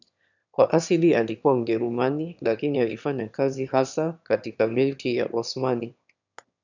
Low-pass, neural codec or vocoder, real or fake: 7.2 kHz; autoencoder, 22.05 kHz, a latent of 192 numbers a frame, VITS, trained on one speaker; fake